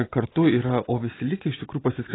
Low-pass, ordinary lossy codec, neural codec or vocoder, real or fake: 7.2 kHz; AAC, 16 kbps; none; real